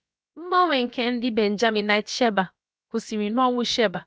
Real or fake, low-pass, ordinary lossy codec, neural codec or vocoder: fake; none; none; codec, 16 kHz, about 1 kbps, DyCAST, with the encoder's durations